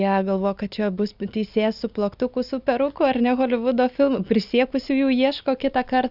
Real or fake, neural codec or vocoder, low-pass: real; none; 5.4 kHz